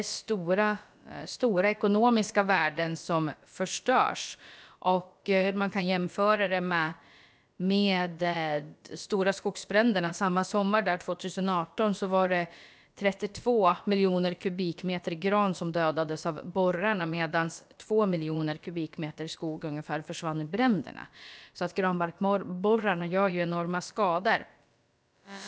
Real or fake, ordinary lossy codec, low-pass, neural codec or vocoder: fake; none; none; codec, 16 kHz, about 1 kbps, DyCAST, with the encoder's durations